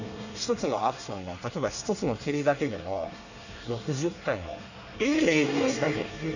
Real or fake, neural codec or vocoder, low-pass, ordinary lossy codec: fake; codec, 24 kHz, 1 kbps, SNAC; 7.2 kHz; none